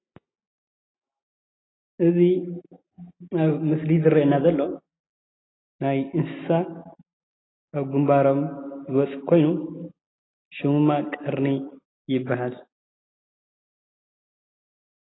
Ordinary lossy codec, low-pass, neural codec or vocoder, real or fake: AAC, 16 kbps; 7.2 kHz; none; real